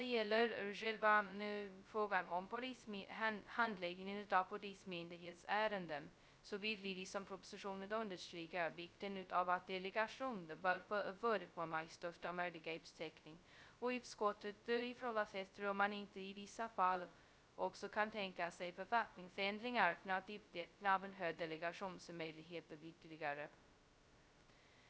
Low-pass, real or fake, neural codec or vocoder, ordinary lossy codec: none; fake; codec, 16 kHz, 0.2 kbps, FocalCodec; none